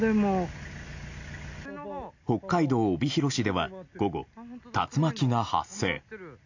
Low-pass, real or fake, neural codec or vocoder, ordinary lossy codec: 7.2 kHz; real; none; AAC, 48 kbps